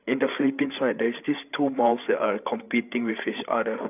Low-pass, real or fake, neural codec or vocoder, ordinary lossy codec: 3.6 kHz; fake; codec, 16 kHz, 8 kbps, FreqCodec, larger model; none